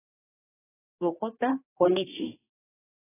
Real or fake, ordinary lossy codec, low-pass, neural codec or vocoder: fake; AAC, 16 kbps; 3.6 kHz; codec, 16 kHz, 2 kbps, X-Codec, HuBERT features, trained on general audio